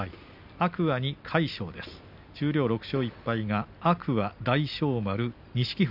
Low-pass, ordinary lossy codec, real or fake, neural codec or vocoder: 5.4 kHz; MP3, 48 kbps; real; none